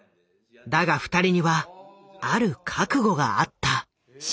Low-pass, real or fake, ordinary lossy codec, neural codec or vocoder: none; real; none; none